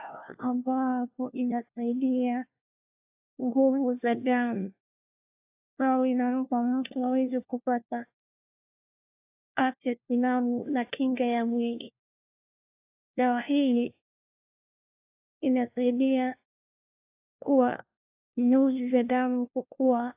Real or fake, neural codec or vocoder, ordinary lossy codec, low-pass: fake; codec, 16 kHz, 1 kbps, FunCodec, trained on LibriTTS, 50 frames a second; AAC, 24 kbps; 3.6 kHz